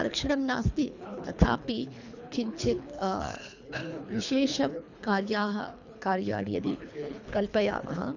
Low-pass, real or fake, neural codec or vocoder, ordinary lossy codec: 7.2 kHz; fake; codec, 24 kHz, 3 kbps, HILCodec; none